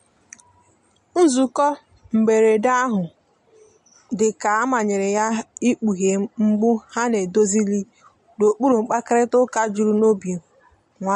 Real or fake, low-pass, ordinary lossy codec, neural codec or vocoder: real; 14.4 kHz; MP3, 48 kbps; none